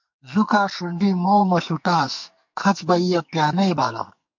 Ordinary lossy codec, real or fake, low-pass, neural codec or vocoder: MP3, 48 kbps; fake; 7.2 kHz; codec, 44.1 kHz, 2.6 kbps, SNAC